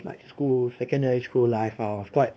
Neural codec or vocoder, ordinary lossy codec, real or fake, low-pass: codec, 16 kHz, 2 kbps, X-Codec, WavLM features, trained on Multilingual LibriSpeech; none; fake; none